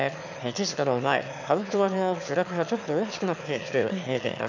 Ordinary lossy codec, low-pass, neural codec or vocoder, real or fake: none; 7.2 kHz; autoencoder, 22.05 kHz, a latent of 192 numbers a frame, VITS, trained on one speaker; fake